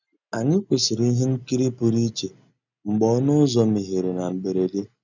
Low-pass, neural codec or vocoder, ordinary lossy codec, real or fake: 7.2 kHz; none; none; real